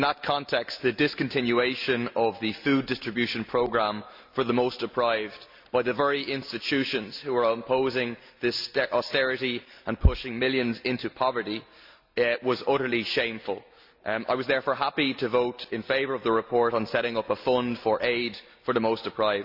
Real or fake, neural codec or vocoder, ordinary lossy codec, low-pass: real; none; MP3, 48 kbps; 5.4 kHz